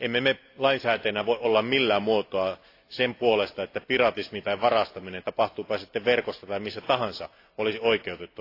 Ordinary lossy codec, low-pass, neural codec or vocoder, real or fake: AAC, 32 kbps; 5.4 kHz; none; real